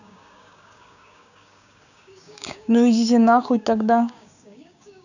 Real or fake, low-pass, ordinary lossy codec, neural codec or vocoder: fake; 7.2 kHz; none; codec, 16 kHz, 6 kbps, DAC